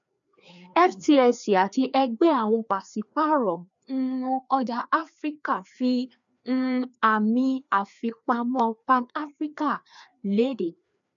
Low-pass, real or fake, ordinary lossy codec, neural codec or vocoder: 7.2 kHz; fake; none; codec, 16 kHz, 2 kbps, FreqCodec, larger model